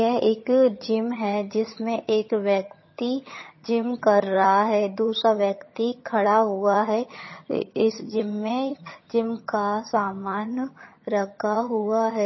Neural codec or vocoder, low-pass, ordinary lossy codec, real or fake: vocoder, 22.05 kHz, 80 mel bands, HiFi-GAN; 7.2 kHz; MP3, 24 kbps; fake